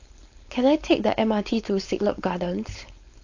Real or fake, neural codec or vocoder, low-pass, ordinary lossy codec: fake; codec, 16 kHz, 4.8 kbps, FACodec; 7.2 kHz; AAC, 48 kbps